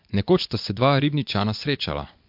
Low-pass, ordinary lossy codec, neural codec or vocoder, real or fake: 5.4 kHz; MP3, 48 kbps; vocoder, 24 kHz, 100 mel bands, Vocos; fake